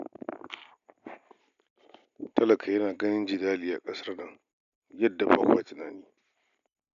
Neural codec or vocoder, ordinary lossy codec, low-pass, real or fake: none; none; 7.2 kHz; real